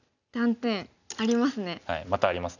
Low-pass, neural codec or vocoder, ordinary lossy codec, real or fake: 7.2 kHz; none; none; real